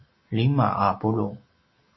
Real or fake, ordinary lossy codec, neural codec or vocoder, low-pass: real; MP3, 24 kbps; none; 7.2 kHz